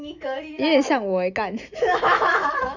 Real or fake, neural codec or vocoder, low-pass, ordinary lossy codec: fake; autoencoder, 48 kHz, 128 numbers a frame, DAC-VAE, trained on Japanese speech; 7.2 kHz; none